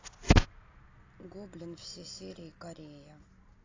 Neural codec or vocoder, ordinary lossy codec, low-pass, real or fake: none; AAC, 32 kbps; 7.2 kHz; real